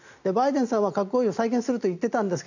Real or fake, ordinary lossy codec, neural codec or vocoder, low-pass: real; none; none; 7.2 kHz